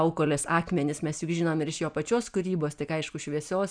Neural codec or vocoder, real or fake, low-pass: none; real; 9.9 kHz